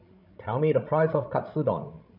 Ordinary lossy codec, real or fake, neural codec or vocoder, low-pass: none; fake; codec, 16 kHz, 16 kbps, FreqCodec, larger model; 5.4 kHz